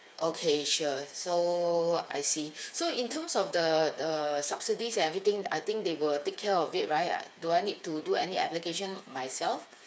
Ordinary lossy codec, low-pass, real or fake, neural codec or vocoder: none; none; fake; codec, 16 kHz, 4 kbps, FreqCodec, smaller model